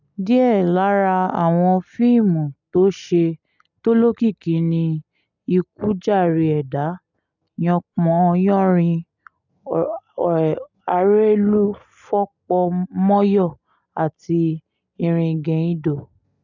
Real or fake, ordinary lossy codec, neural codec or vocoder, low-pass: real; none; none; 7.2 kHz